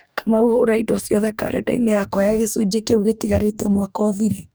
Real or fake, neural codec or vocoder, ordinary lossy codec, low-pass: fake; codec, 44.1 kHz, 2.6 kbps, DAC; none; none